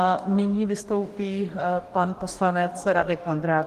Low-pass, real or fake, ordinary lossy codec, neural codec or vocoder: 14.4 kHz; fake; Opus, 24 kbps; codec, 44.1 kHz, 2.6 kbps, DAC